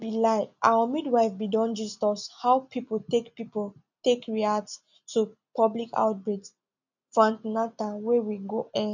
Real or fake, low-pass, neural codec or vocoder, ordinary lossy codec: real; 7.2 kHz; none; none